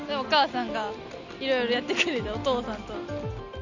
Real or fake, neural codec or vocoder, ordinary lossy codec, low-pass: real; none; none; 7.2 kHz